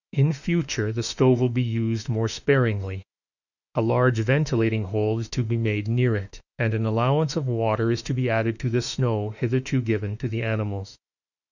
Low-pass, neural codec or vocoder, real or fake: 7.2 kHz; autoencoder, 48 kHz, 32 numbers a frame, DAC-VAE, trained on Japanese speech; fake